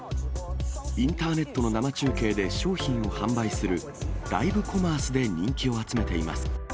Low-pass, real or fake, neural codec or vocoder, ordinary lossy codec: none; real; none; none